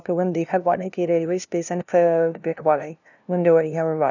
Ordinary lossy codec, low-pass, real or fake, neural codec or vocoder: none; 7.2 kHz; fake; codec, 16 kHz, 0.5 kbps, FunCodec, trained on LibriTTS, 25 frames a second